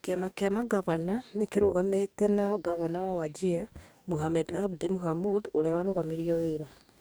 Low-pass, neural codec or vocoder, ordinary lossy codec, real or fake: none; codec, 44.1 kHz, 2.6 kbps, DAC; none; fake